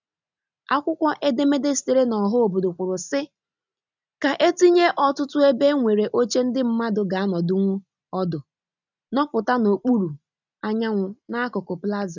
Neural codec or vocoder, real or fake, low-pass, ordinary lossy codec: none; real; 7.2 kHz; none